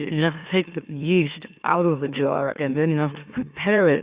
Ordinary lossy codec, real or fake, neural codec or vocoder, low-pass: Opus, 64 kbps; fake; autoencoder, 44.1 kHz, a latent of 192 numbers a frame, MeloTTS; 3.6 kHz